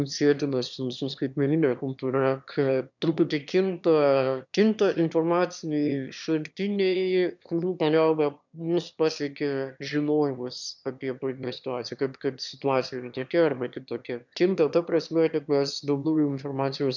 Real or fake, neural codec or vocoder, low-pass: fake; autoencoder, 22.05 kHz, a latent of 192 numbers a frame, VITS, trained on one speaker; 7.2 kHz